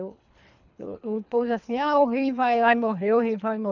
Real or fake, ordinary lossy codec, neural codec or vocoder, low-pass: fake; none; codec, 24 kHz, 3 kbps, HILCodec; 7.2 kHz